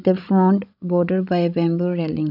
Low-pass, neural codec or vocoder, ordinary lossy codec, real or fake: 5.4 kHz; codec, 16 kHz, 16 kbps, FreqCodec, larger model; none; fake